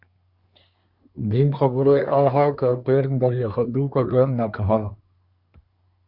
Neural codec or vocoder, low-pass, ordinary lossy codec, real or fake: codec, 24 kHz, 1 kbps, SNAC; 5.4 kHz; Opus, 64 kbps; fake